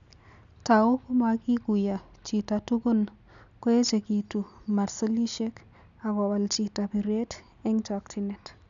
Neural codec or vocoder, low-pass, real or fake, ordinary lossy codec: none; 7.2 kHz; real; none